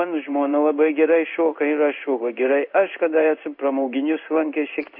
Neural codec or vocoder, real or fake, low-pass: codec, 16 kHz in and 24 kHz out, 1 kbps, XY-Tokenizer; fake; 5.4 kHz